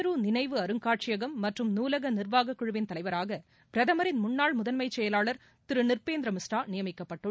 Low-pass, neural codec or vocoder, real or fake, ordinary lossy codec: none; none; real; none